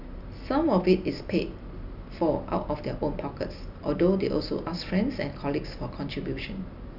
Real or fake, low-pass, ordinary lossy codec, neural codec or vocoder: real; 5.4 kHz; none; none